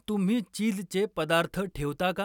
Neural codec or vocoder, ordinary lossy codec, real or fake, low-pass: none; none; real; 19.8 kHz